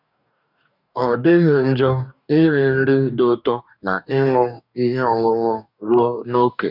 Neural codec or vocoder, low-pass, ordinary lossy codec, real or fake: codec, 44.1 kHz, 2.6 kbps, DAC; 5.4 kHz; none; fake